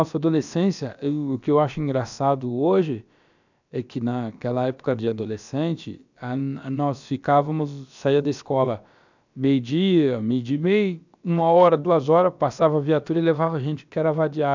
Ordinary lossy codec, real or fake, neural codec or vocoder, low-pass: none; fake; codec, 16 kHz, about 1 kbps, DyCAST, with the encoder's durations; 7.2 kHz